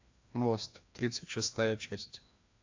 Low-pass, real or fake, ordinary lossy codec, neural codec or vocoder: 7.2 kHz; fake; AAC, 48 kbps; codec, 16 kHz, 1 kbps, FreqCodec, larger model